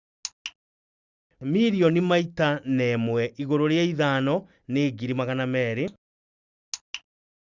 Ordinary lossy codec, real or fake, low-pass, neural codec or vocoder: Opus, 64 kbps; real; 7.2 kHz; none